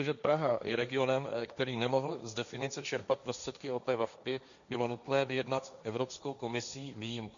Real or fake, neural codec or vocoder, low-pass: fake; codec, 16 kHz, 1.1 kbps, Voila-Tokenizer; 7.2 kHz